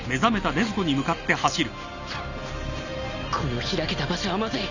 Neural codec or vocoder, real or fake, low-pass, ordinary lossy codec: none; real; 7.2 kHz; none